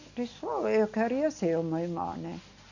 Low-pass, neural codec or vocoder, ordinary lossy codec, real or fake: 7.2 kHz; none; none; real